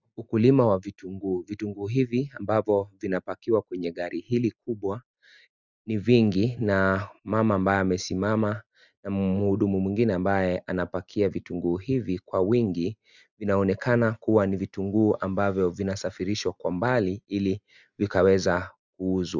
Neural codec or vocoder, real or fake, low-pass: none; real; 7.2 kHz